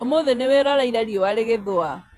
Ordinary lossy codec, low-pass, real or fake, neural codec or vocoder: none; 14.4 kHz; fake; vocoder, 48 kHz, 128 mel bands, Vocos